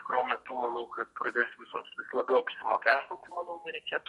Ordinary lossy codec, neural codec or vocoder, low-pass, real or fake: MP3, 48 kbps; codec, 32 kHz, 1.9 kbps, SNAC; 14.4 kHz; fake